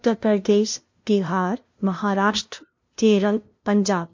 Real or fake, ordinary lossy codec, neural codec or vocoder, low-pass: fake; MP3, 48 kbps; codec, 16 kHz, 0.5 kbps, FunCodec, trained on LibriTTS, 25 frames a second; 7.2 kHz